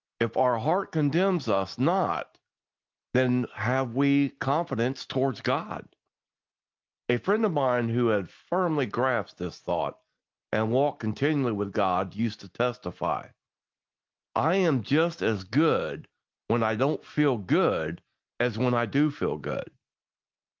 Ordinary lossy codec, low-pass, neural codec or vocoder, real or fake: Opus, 32 kbps; 7.2 kHz; none; real